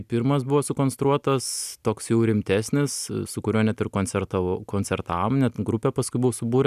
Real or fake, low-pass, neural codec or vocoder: real; 14.4 kHz; none